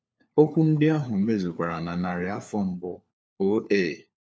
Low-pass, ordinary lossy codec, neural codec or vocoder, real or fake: none; none; codec, 16 kHz, 4 kbps, FunCodec, trained on LibriTTS, 50 frames a second; fake